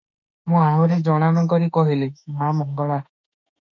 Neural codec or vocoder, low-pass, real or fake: autoencoder, 48 kHz, 32 numbers a frame, DAC-VAE, trained on Japanese speech; 7.2 kHz; fake